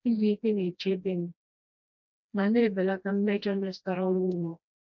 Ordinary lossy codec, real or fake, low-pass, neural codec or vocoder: none; fake; 7.2 kHz; codec, 16 kHz, 1 kbps, FreqCodec, smaller model